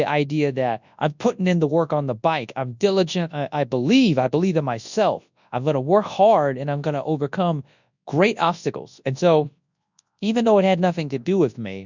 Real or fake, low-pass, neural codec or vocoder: fake; 7.2 kHz; codec, 24 kHz, 0.9 kbps, WavTokenizer, large speech release